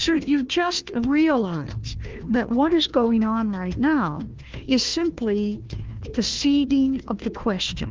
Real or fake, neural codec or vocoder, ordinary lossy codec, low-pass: fake; codec, 16 kHz, 1 kbps, FunCodec, trained on Chinese and English, 50 frames a second; Opus, 32 kbps; 7.2 kHz